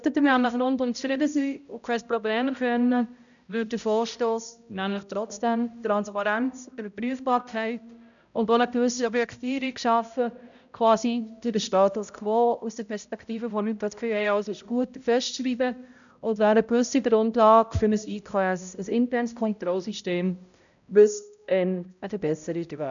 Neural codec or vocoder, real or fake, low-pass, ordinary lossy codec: codec, 16 kHz, 0.5 kbps, X-Codec, HuBERT features, trained on balanced general audio; fake; 7.2 kHz; none